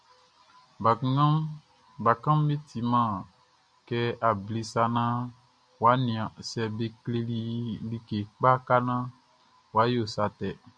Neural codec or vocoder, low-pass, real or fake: none; 9.9 kHz; real